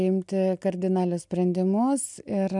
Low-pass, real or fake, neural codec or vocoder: 10.8 kHz; real; none